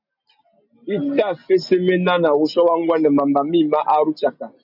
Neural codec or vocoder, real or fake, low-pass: none; real; 5.4 kHz